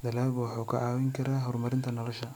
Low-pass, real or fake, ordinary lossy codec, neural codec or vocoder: none; real; none; none